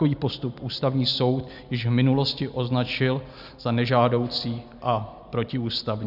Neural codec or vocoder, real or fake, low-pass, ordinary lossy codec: none; real; 5.4 kHz; AAC, 48 kbps